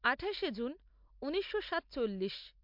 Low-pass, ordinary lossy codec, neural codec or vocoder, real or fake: 5.4 kHz; MP3, 48 kbps; none; real